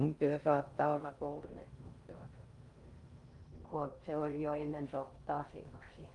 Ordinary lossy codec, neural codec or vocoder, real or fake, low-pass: Opus, 32 kbps; codec, 16 kHz in and 24 kHz out, 0.6 kbps, FocalCodec, streaming, 4096 codes; fake; 10.8 kHz